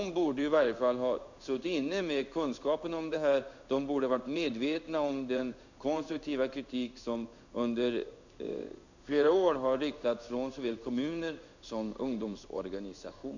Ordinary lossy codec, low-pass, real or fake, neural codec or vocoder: none; 7.2 kHz; fake; codec, 16 kHz in and 24 kHz out, 1 kbps, XY-Tokenizer